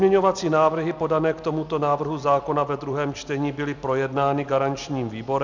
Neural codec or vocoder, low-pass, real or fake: none; 7.2 kHz; real